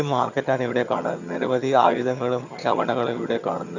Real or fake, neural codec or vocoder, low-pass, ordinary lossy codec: fake; vocoder, 22.05 kHz, 80 mel bands, HiFi-GAN; 7.2 kHz; none